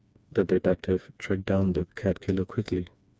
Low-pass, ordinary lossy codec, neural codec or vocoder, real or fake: none; none; codec, 16 kHz, 2 kbps, FreqCodec, smaller model; fake